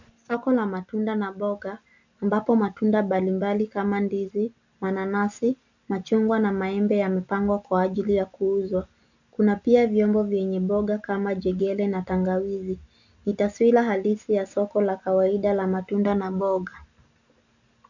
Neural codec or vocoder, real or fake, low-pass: none; real; 7.2 kHz